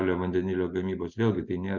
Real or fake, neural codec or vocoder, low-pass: real; none; 7.2 kHz